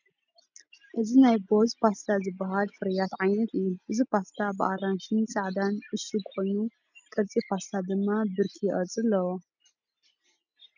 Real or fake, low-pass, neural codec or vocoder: real; 7.2 kHz; none